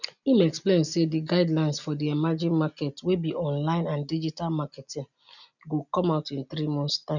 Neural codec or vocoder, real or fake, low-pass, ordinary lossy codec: none; real; 7.2 kHz; none